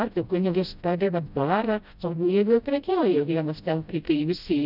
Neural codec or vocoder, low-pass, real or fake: codec, 16 kHz, 0.5 kbps, FreqCodec, smaller model; 5.4 kHz; fake